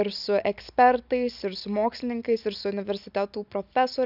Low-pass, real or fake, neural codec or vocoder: 5.4 kHz; real; none